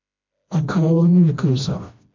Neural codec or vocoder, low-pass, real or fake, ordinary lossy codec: codec, 16 kHz, 1 kbps, FreqCodec, smaller model; 7.2 kHz; fake; MP3, 48 kbps